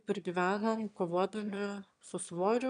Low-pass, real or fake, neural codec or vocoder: 9.9 kHz; fake; autoencoder, 22.05 kHz, a latent of 192 numbers a frame, VITS, trained on one speaker